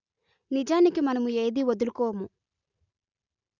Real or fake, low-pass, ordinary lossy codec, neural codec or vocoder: real; 7.2 kHz; none; none